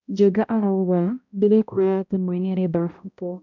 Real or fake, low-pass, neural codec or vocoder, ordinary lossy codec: fake; 7.2 kHz; codec, 16 kHz, 0.5 kbps, X-Codec, HuBERT features, trained on balanced general audio; none